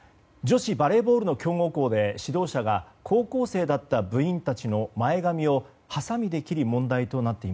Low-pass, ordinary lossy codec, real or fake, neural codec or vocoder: none; none; real; none